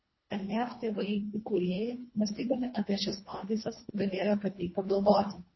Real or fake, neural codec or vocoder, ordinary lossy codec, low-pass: fake; codec, 24 kHz, 1.5 kbps, HILCodec; MP3, 24 kbps; 7.2 kHz